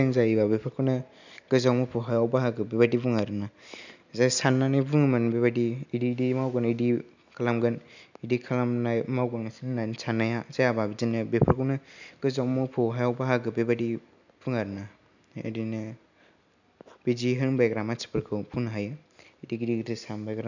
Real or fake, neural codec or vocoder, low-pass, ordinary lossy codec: real; none; 7.2 kHz; none